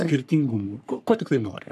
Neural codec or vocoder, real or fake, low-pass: codec, 44.1 kHz, 3.4 kbps, Pupu-Codec; fake; 14.4 kHz